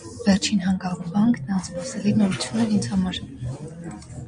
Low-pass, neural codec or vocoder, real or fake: 9.9 kHz; none; real